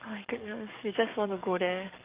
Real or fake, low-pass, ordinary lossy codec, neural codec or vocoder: fake; 3.6 kHz; Opus, 24 kbps; codec, 44.1 kHz, 7.8 kbps, DAC